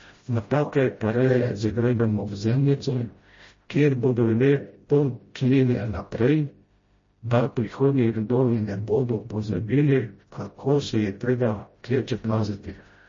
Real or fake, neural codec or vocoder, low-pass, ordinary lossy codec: fake; codec, 16 kHz, 0.5 kbps, FreqCodec, smaller model; 7.2 kHz; MP3, 32 kbps